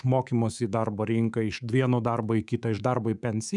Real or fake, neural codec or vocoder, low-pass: fake; codec, 24 kHz, 3.1 kbps, DualCodec; 10.8 kHz